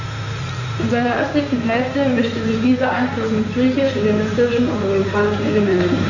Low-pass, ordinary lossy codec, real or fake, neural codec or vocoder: 7.2 kHz; none; fake; codec, 16 kHz in and 24 kHz out, 2.2 kbps, FireRedTTS-2 codec